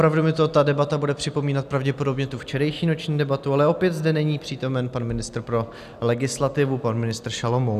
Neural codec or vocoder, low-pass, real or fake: none; 14.4 kHz; real